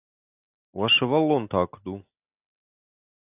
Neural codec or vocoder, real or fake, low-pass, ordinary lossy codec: none; real; 3.6 kHz; AAC, 32 kbps